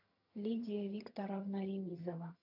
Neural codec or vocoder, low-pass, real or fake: vocoder, 22.05 kHz, 80 mel bands, HiFi-GAN; 5.4 kHz; fake